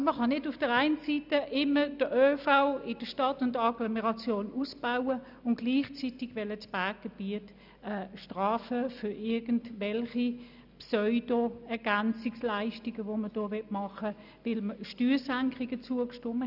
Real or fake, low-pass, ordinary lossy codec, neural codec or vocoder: real; 5.4 kHz; none; none